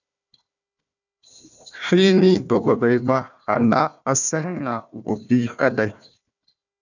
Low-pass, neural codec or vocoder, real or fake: 7.2 kHz; codec, 16 kHz, 1 kbps, FunCodec, trained on Chinese and English, 50 frames a second; fake